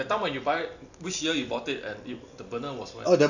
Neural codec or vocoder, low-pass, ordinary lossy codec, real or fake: none; 7.2 kHz; none; real